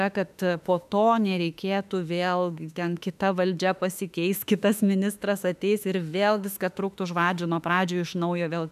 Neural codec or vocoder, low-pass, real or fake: autoencoder, 48 kHz, 32 numbers a frame, DAC-VAE, trained on Japanese speech; 14.4 kHz; fake